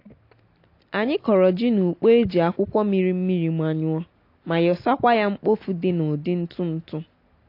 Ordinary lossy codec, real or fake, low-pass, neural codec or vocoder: AAC, 32 kbps; real; 5.4 kHz; none